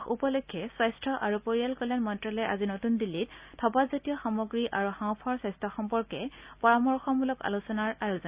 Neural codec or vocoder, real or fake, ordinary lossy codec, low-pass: none; real; Opus, 64 kbps; 3.6 kHz